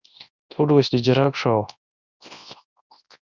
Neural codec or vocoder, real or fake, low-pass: codec, 24 kHz, 0.9 kbps, WavTokenizer, large speech release; fake; 7.2 kHz